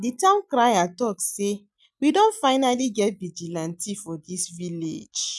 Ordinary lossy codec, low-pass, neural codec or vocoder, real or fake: none; none; none; real